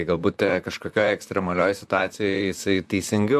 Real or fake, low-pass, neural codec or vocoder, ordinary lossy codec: fake; 14.4 kHz; vocoder, 44.1 kHz, 128 mel bands, Pupu-Vocoder; AAC, 96 kbps